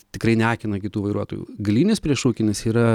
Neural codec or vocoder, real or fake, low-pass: none; real; 19.8 kHz